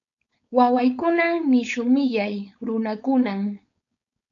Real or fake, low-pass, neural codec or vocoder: fake; 7.2 kHz; codec, 16 kHz, 4.8 kbps, FACodec